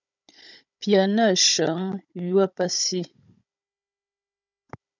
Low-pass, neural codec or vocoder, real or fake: 7.2 kHz; codec, 16 kHz, 16 kbps, FunCodec, trained on Chinese and English, 50 frames a second; fake